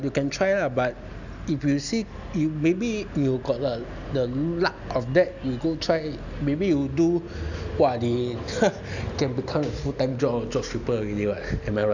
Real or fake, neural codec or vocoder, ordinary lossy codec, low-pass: real; none; none; 7.2 kHz